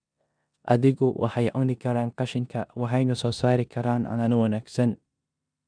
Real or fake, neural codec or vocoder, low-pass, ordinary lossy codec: fake; codec, 16 kHz in and 24 kHz out, 0.9 kbps, LongCat-Audio-Codec, four codebook decoder; 9.9 kHz; none